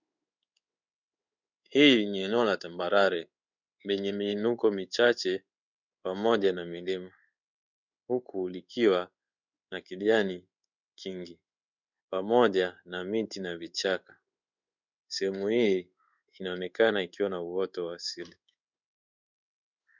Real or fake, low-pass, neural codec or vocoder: fake; 7.2 kHz; codec, 16 kHz in and 24 kHz out, 1 kbps, XY-Tokenizer